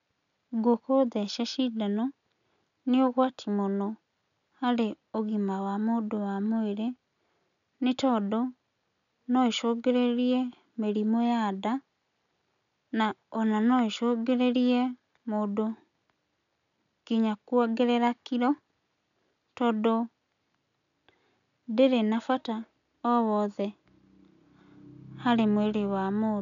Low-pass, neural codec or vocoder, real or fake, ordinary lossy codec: 7.2 kHz; none; real; none